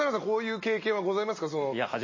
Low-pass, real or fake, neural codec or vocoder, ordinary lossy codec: 7.2 kHz; real; none; MP3, 32 kbps